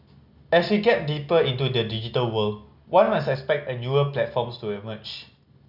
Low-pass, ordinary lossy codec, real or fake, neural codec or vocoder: 5.4 kHz; none; real; none